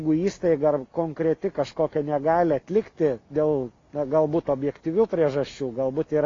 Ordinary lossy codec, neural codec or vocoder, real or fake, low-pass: AAC, 32 kbps; none; real; 7.2 kHz